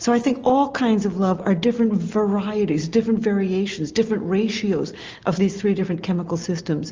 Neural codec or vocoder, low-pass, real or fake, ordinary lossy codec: none; 7.2 kHz; real; Opus, 24 kbps